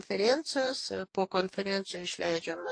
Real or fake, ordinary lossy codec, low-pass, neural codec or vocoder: fake; AAC, 48 kbps; 9.9 kHz; codec, 44.1 kHz, 2.6 kbps, DAC